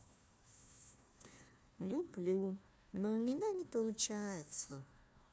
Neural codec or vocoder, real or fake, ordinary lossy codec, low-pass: codec, 16 kHz, 1 kbps, FunCodec, trained on Chinese and English, 50 frames a second; fake; none; none